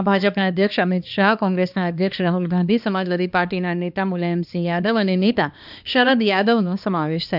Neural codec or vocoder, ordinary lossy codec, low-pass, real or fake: codec, 16 kHz, 2 kbps, X-Codec, HuBERT features, trained on balanced general audio; none; 5.4 kHz; fake